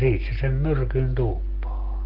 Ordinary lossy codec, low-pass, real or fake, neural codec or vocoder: Opus, 16 kbps; 5.4 kHz; real; none